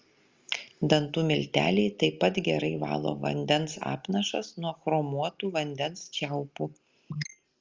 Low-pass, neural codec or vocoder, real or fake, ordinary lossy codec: 7.2 kHz; none; real; Opus, 32 kbps